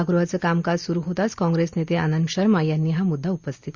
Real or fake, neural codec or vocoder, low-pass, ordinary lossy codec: real; none; 7.2 kHz; Opus, 64 kbps